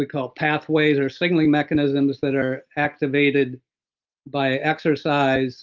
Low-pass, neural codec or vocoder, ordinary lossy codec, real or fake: 7.2 kHz; none; Opus, 32 kbps; real